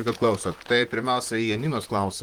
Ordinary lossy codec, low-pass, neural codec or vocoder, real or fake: Opus, 24 kbps; 19.8 kHz; vocoder, 44.1 kHz, 128 mel bands, Pupu-Vocoder; fake